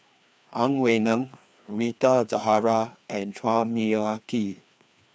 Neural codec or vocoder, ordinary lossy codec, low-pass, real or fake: codec, 16 kHz, 2 kbps, FreqCodec, larger model; none; none; fake